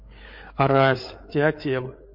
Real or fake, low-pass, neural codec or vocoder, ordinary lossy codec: fake; 5.4 kHz; codec, 16 kHz, 8 kbps, FreqCodec, larger model; MP3, 32 kbps